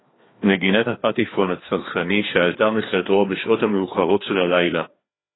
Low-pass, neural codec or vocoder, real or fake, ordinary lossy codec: 7.2 kHz; codec, 16 kHz, 2 kbps, FreqCodec, larger model; fake; AAC, 16 kbps